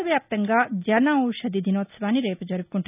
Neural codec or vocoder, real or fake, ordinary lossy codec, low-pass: none; real; none; 3.6 kHz